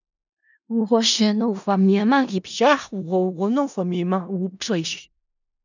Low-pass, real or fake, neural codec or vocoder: 7.2 kHz; fake; codec, 16 kHz in and 24 kHz out, 0.4 kbps, LongCat-Audio-Codec, four codebook decoder